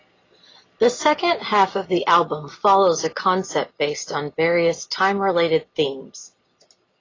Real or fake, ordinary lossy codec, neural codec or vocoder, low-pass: real; AAC, 32 kbps; none; 7.2 kHz